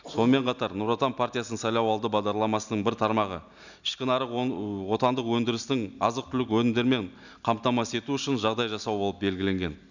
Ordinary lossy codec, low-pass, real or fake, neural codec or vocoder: none; 7.2 kHz; real; none